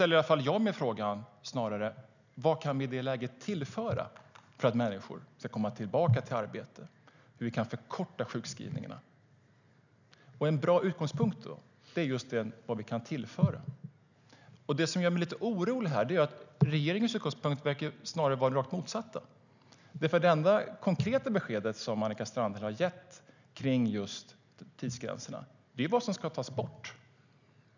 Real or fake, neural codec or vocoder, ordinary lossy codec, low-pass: real; none; none; 7.2 kHz